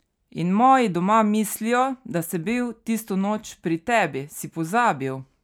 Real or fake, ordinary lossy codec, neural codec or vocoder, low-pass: real; none; none; 19.8 kHz